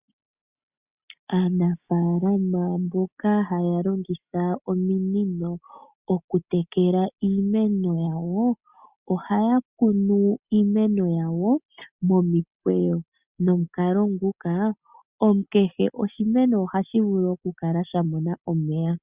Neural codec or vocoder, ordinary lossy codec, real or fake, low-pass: none; Opus, 64 kbps; real; 3.6 kHz